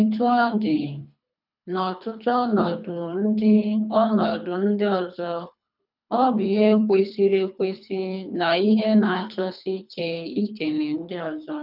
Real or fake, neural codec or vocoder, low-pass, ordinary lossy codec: fake; codec, 24 kHz, 3 kbps, HILCodec; 5.4 kHz; none